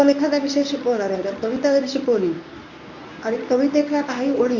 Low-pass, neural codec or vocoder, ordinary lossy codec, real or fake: 7.2 kHz; codec, 24 kHz, 0.9 kbps, WavTokenizer, medium speech release version 1; none; fake